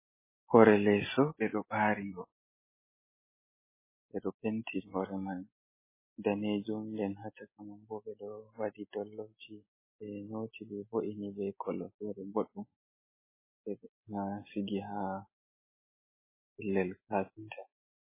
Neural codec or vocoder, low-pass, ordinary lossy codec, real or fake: none; 3.6 kHz; MP3, 16 kbps; real